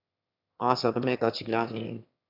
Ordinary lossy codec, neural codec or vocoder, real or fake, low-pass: AAC, 48 kbps; autoencoder, 22.05 kHz, a latent of 192 numbers a frame, VITS, trained on one speaker; fake; 5.4 kHz